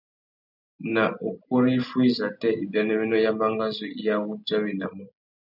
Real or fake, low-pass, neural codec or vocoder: real; 5.4 kHz; none